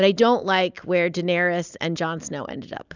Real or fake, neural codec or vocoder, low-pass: real; none; 7.2 kHz